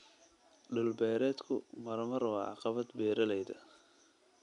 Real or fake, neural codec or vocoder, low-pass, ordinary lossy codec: real; none; 10.8 kHz; none